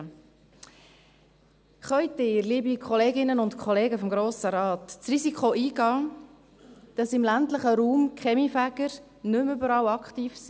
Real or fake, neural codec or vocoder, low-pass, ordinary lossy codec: real; none; none; none